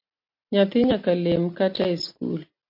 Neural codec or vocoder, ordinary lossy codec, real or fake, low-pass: none; MP3, 32 kbps; real; 5.4 kHz